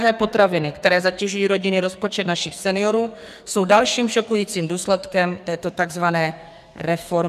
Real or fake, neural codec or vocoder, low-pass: fake; codec, 44.1 kHz, 2.6 kbps, SNAC; 14.4 kHz